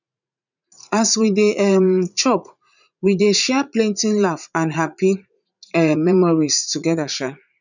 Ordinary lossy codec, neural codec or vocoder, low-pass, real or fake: none; vocoder, 44.1 kHz, 80 mel bands, Vocos; 7.2 kHz; fake